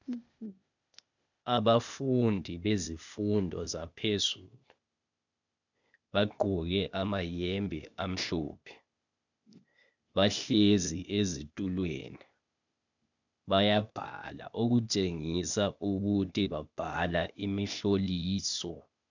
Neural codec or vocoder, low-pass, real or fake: codec, 16 kHz, 0.8 kbps, ZipCodec; 7.2 kHz; fake